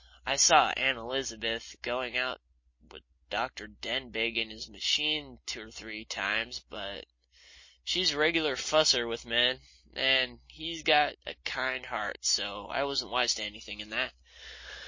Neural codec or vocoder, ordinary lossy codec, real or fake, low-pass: none; MP3, 32 kbps; real; 7.2 kHz